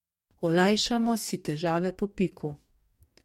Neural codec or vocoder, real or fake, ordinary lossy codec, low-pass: codec, 44.1 kHz, 2.6 kbps, DAC; fake; MP3, 64 kbps; 19.8 kHz